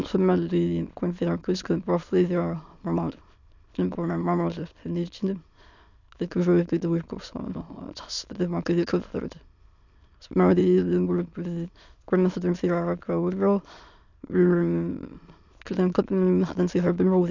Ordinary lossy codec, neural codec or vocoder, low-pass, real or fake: none; autoencoder, 22.05 kHz, a latent of 192 numbers a frame, VITS, trained on many speakers; 7.2 kHz; fake